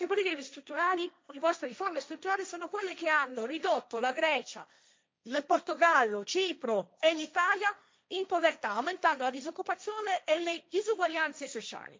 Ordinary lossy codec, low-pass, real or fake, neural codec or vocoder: none; none; fake; codec, 16 kHz, 1.1 kbps, Voila-Tokenizer